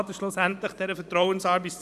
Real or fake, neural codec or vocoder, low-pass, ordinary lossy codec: fake; vocoder, 44.1 kHz, 128 mel bands every 512 samples, BigVGAN v2; 14.4 kHz; none